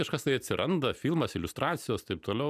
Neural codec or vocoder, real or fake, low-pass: vocoder, 44.1 kHz, 128 mel bands every 256 samples, BigVGAN v2; fake; 14.4 kHz